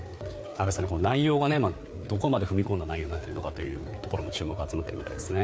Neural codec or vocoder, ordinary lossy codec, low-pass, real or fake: codec, 16 kHz, 4 kbps, FreqCodec, larger model; none; none; fake